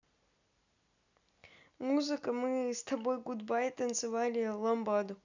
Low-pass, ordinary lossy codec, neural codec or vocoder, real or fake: 7.2 kHz; none; none; real